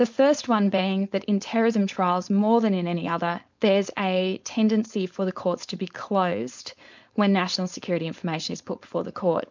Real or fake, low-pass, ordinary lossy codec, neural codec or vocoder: fake; 7.2 kHz; MP3, 64 kbps; codec, 16 kHz, 4.8 kbps, FACodec